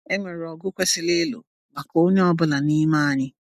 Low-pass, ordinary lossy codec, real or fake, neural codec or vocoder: 14.4 kHz; none; real; none